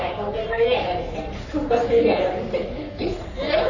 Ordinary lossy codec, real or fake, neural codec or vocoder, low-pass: none; fake; codec, 44.1 kHz, 3.4 kbps, Pupu-Codec; 7.2 kHz